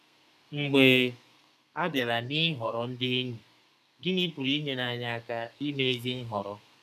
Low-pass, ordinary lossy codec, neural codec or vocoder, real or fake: 14.4 kHz; none; codec, 32 kHz, 1.9 kbps, SNAC; fake